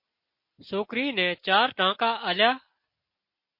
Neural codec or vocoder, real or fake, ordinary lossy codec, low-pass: none; real; MP3, 32 kbps; 5.4 kHz